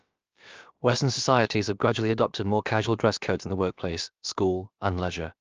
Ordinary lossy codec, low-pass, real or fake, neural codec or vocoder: Opus, 24 kbps; 7.2 kHz; fake; codec, 16 kHz, about 1 kbps, DyCAST, with the encoder's durations